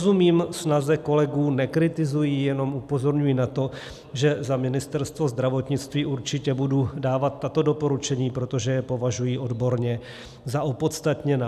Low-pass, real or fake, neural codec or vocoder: 14.4 kHz; real; none